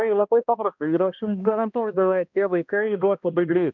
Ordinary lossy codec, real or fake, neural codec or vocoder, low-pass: MP3, 64 kbps; fake; codec, 16 kHz, 1 kbps, X-Codec, HuBERT features, trained on balanced general audio; 7.2 kHz